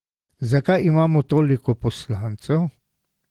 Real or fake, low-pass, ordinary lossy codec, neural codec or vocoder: real; 19.8 kHz; Opus, 16 kbps; none